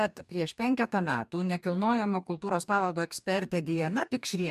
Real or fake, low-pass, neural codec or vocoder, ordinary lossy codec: fake; 14.4 kHz; codec, 44.1 kHz, 2.6 kbps, DAC; MP3, 96 kbps